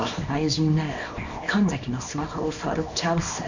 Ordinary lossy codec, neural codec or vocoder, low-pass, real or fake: none; codec, 24 kHz, 0.9 kbps, WavTokenizer, small release; 7.2 kHz; fake